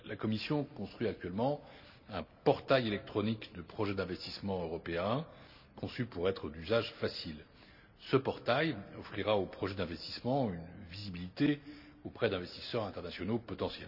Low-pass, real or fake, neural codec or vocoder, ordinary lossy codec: 5.4 kHz; real; none; none